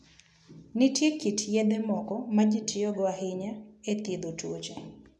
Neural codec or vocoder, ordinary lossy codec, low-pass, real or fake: none; none; none; real